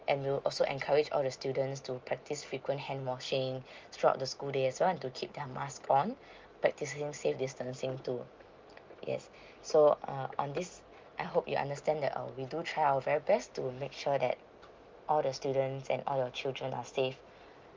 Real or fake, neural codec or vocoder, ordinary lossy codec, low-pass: real; none; Opus, 24 kbps; 7.2 kHz